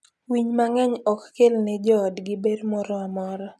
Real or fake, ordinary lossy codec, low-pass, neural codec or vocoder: real; none; none; none